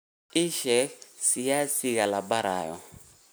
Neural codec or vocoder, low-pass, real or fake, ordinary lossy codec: none; none; real; none